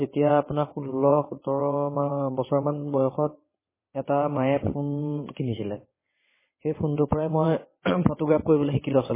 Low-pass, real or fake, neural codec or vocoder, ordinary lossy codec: 3.6 kHz; fake; vocoder, 22.05 kHz, 80 mel bands, WaveNeXt; MP3, 16 kbps